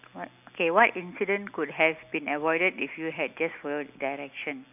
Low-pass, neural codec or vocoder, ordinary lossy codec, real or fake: 3.6 kHz; none; none; real